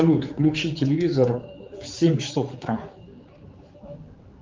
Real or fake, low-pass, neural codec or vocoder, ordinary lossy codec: fake; 7.2 kHz; codec, 16 kHz, 4 kbps, X-Codec, HuBERT features, trained on general audio; Opus, 32 kbps